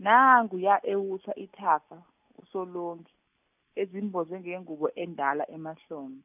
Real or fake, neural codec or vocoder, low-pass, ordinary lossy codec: real; none; 3.6 kHz; none